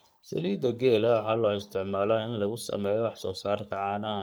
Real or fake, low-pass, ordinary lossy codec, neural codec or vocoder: fake; none; none; codec, 44.1 kHz, 3.4 kbps, Pupu-Codec